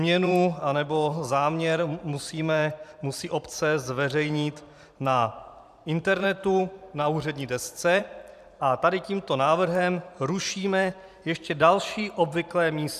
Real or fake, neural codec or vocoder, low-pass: fake; vocoder, 44.1 kHz, 128 mel bands every 512 samples, BigVGAN v2; 14.4 kHz